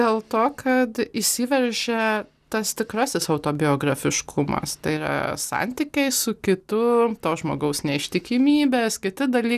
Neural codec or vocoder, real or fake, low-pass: none; real; 14.4 kHz